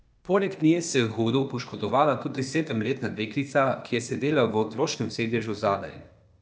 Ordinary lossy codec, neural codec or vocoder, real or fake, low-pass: none; codec, 16 kHz, 0.8 kbps, ZipCodec; fake; none